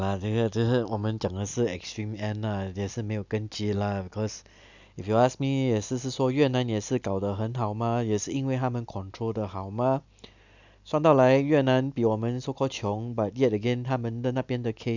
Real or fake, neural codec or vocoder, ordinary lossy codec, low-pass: real; none; none; 7.2 kHz